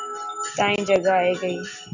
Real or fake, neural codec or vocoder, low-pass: real; none; 7.2 kHz